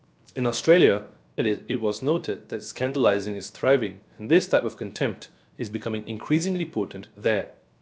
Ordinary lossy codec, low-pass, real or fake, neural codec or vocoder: none; none; fake; codec, 16 kHz, 0.7 kbps, FocalCodec